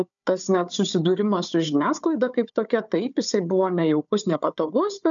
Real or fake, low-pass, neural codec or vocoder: fake; 7.2 kHz; codec, 16 kHz, 4 kbps, FunCodec, trained on Chinese and English, 50 frames a second